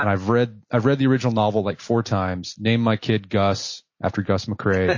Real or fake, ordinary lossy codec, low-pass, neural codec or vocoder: real; MP3, 32 kbps; 7.2 kHz; none